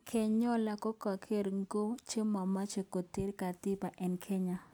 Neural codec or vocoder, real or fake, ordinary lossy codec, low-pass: none; real; none; none